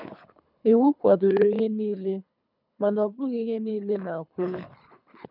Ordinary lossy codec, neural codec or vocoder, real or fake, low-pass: none; codec, 24 kHz, 3 kbps, HILCodec; fake; 5.4 kHz